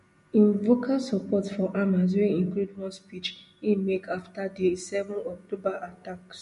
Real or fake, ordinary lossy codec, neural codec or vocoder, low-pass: real; MP3, 48 kbps; none; 14.4 kHz